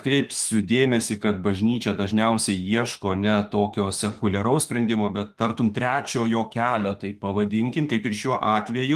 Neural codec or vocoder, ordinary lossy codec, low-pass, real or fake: autoencoder, 48 kHz, 32 numbers a frame, DAC-VAE, trained on Japanese speech; Opus, 24 kbps; 14.4 kHz; fake